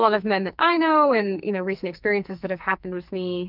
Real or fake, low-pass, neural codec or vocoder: fake; 5.4 kHz; codec, 44.1 kHz, 2.6 kbps, SNAC